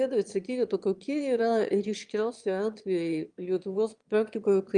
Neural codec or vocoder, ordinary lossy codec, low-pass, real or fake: autoencoder, 22.05 kHz, a latent of 192 numbers a frame, VITS, trained on one speaker; Opus, 32 kbps; 9.9 kHz; fake